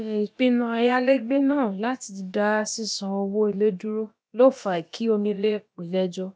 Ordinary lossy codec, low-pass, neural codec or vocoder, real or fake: none; none; codec, 16 kHz, about 1 kbps, DyCAST, with the encoder's durations; fake